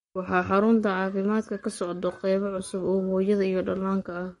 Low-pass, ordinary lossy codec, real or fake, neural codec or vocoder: 19.8 kHz; MP3, 48 kbps; fake; codec, 44.1 kHz, 7.8 kbps, Pupu-Codec